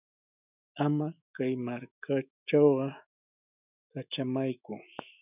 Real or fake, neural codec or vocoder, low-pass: fake; autoencoder, 48 kHz, 128 numbers a frame, DAC-VAE, trained on Japanese speech; 3.6 kHz